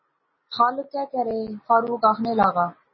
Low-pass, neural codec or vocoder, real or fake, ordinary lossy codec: 7.2 kHz; none; real; MP3, 24 kbps